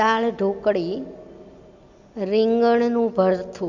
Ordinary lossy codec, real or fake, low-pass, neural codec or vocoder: Opus, 64 kbps; real; 7.2 kHz; none